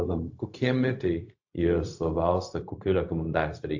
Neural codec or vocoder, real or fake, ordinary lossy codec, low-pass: codec, 16 kHz, 0.4 kbps, LongCat-Audio-Codec; fake; AAC, 48 kbps; 7.2 kHz